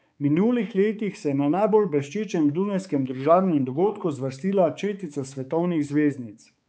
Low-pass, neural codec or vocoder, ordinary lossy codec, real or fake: none; codec, 16 kHz, 4 kbps, X-Codec, HuBERT features, trained on balanced general audio; none; fake